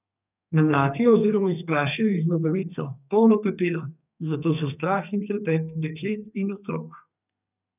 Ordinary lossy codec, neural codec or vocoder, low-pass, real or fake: none; codec, 32 kHz, 1.9 kbps, SNAC; 3.6 kHz; fake